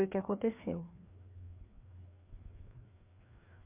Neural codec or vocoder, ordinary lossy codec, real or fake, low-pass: codec, 16 kHz, 2 kbps, FreqCodec, larger model; MP3, 32 kbps; fake; 3.6 kHz